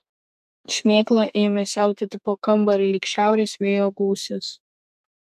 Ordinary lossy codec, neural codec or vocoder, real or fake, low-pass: MP3, 96 kbps; codec, 32 kHz, 1.9 kbps, SNAC; fake; 14.4 kHz